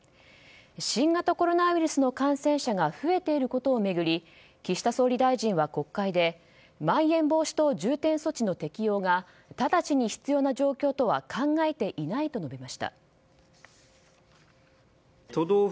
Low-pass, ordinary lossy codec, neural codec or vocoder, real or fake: none; none; none; real